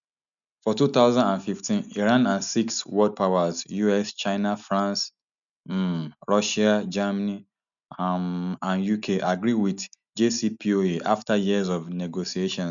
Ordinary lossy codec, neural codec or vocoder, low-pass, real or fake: none; none; 7.2 kHz; real